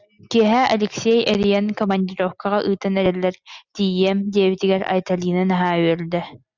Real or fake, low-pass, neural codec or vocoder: real; 7.2 kHz; none